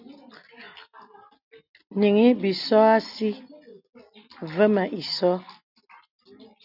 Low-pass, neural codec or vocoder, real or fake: 5.4 kHz; none; real